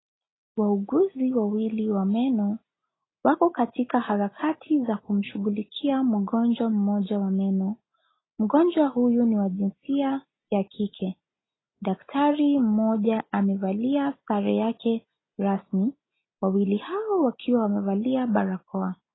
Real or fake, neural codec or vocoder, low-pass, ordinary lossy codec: real; none; 7.2 kHz; AAC, 16 kbps